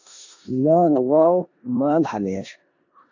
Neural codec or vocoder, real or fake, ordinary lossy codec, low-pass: codec, 16 kHz in and 24 kHz out, 0.9 kbps, LongCat-Audio-Codec, four codebook decoder; fake; AAC, 48 kbps; 7.2 kHz